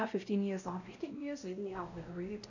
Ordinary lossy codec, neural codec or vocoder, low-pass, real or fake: none; codec, 16 kHz, 0.5 kbps, X-Codec, WavLM features, trained on Multilingual LibriSpeech; 7.2 kHz; fake